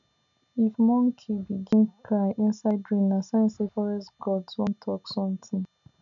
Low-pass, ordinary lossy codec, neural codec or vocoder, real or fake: 7.2 kHz; none; none; real